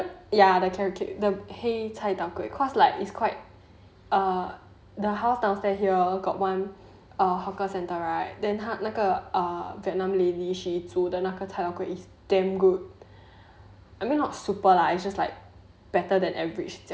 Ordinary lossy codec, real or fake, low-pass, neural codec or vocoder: none; real; none; none